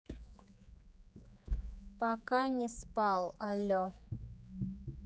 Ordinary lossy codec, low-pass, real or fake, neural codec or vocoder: none; none; fake; codec, 16 kHz, 4 kbps, X-Codec, HuBERT features, trained on general audio